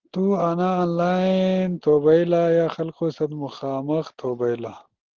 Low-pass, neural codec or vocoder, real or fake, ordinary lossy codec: 7.2 kHz; none; real; Opus, 16 kbps